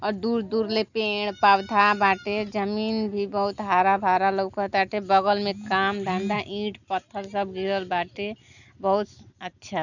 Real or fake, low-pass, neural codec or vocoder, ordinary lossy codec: real; 7.2 kHz; none; none